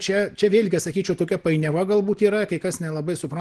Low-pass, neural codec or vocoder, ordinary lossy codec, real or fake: 10.8 kHz; none; Opus, 16 kbps; real